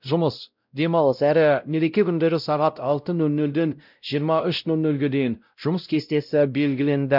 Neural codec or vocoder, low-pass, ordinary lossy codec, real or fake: codec, 16 kHz, 0.5 kbps, X-Codec, WavLM features, trained on Multilingual LibriSpeech; 5.4 kHz; none; fake